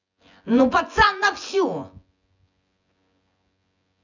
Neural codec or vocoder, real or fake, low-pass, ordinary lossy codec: vocoder, 24 kHz, 100 mel bands, Vocos; fake; 7.2 kHz; none